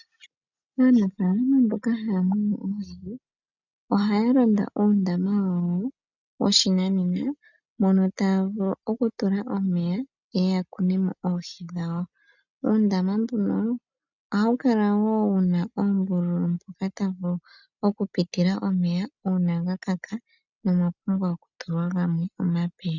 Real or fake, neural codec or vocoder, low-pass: real; none; 7.2 kHz